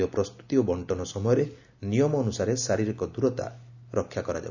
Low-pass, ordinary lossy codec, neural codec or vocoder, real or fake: 7.2 kHz; none; none; real